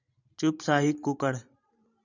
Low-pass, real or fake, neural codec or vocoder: 7.2 kHz; fake; vocoder, 44.1 kHz, 128 mel bands every 512 samples, BigVGAN v2